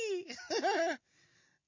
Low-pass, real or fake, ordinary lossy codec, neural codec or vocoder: 7.2 kHz; real; MP3, 32 kbps; none